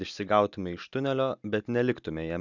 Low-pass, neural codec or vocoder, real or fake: 7.2 kHz; none; real